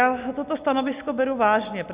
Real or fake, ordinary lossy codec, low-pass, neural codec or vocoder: real; Opus, 64 kbps; 3.6 kHz; none